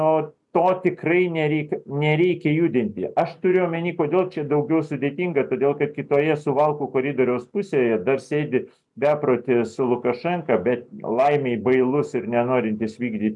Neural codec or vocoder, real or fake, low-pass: none; real; 10.8 kHz